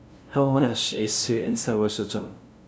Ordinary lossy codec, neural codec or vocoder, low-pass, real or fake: none; codec, 16 kHz, 0.5 kbps, FunCodec, trained on LibriTTS, 25 frames a second; none; fake